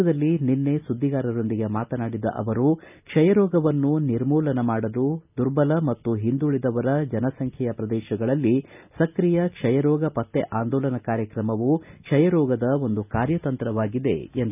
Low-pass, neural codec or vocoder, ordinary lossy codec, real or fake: 3.6 kHz; none; none; real